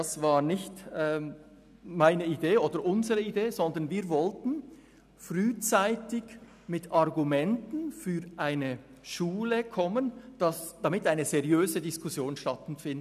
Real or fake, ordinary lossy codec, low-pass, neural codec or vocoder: real; none; 14.4 kHz; none